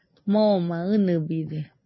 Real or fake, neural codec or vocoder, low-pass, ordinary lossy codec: real; none; 7.2 kHz; MP3, 24 kbps